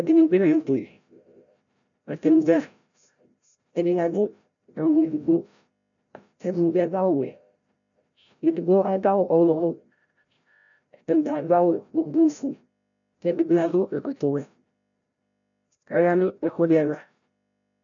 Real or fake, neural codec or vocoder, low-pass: fake; codec, 16 kHz, 0.5 kbps, FreqCodec, larger model; 7.2 kHz